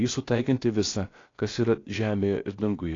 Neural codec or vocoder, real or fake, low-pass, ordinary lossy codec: codec, 16 kHz, about 1 kbps, DyCAST, with the encoder's durations; fake; 7.2 kHz; AAC, 32 kbps